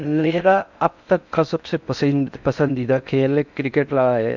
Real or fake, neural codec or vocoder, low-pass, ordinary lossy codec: fake; codec, 16 kHz in and 24 kHz out, 0.6 kbps, FocalCodec, streaming, 4096 codes; 7.2 kHz; none